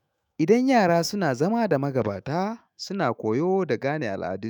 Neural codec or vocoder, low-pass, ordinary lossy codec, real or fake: autoencoder, 48 kHz, 128 numbers a frame, DAC-VAE, trained on Japanese speech; 19.8 kHz; none; fake